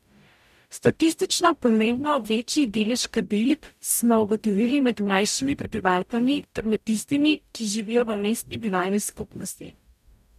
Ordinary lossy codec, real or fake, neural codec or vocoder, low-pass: none; fake; codec, 44.1 kHz, 0.9 kbps, DAC; 14.4 kHz